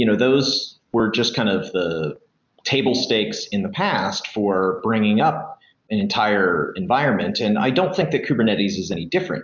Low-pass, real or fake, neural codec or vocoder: 7.2 kHz; real; none